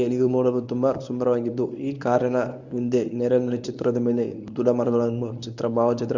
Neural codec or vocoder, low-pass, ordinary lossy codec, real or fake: codec, 24 kHz, 0.9 kbps, WavTokenizer, medium speech release version 1; 7.2 kHz; none; fake